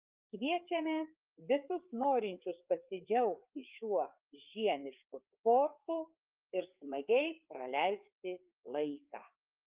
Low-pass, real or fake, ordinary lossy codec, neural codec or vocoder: 3.6 kHz; fake; Opus, 24 kbps; codec, 16 kHz, 4 kbps, FreqCodec, larger model